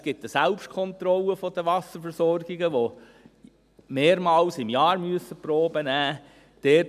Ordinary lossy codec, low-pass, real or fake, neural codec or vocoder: none; 14.4 kHz; real; none